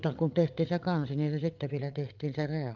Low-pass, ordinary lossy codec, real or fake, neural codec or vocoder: 7.2 kHz; Opus, 24 kbps; fake; codec, 16 kHz, 8 kbps, FreqCodec, larger model